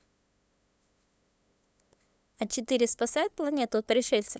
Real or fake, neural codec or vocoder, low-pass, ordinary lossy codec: fake; codec, 16 kHz, 8 kbps, FunCodec, trained on LibriTTS, 25 frames a second; none; none